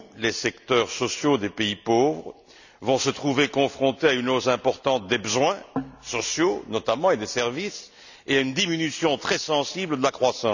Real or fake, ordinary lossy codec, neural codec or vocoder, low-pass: real; none; none; 7.2 kHz